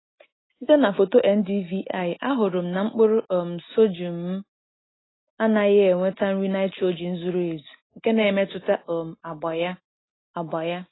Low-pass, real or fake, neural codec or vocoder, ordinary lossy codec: 7.2 kHz; real; none; AAC, 16 kbps